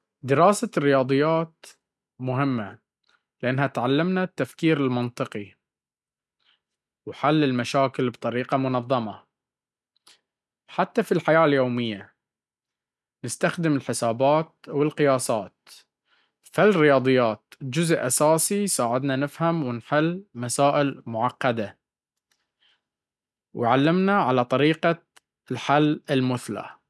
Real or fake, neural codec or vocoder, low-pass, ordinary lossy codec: real; none; none; none